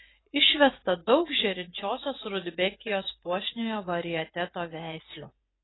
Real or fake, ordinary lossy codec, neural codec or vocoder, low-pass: fake; AAC, 16 kbps; vocoder, 24 kHz, 100 mel bands, Vocos; 7.2 kHz